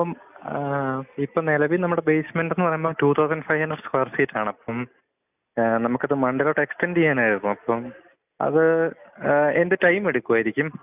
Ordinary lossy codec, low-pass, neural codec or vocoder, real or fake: none; 3.6 kHz; none; real